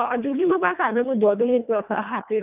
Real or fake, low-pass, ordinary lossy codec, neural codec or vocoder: fake; 3.6 kHz; none; codec, 24 kHz, 1.5 kbps, HILCodec